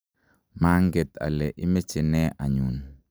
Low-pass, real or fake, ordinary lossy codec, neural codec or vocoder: none; real; none; none